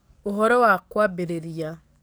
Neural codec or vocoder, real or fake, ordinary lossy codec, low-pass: codec, 44.1 kHz, 7.8 kbps, DAC; fake; none; none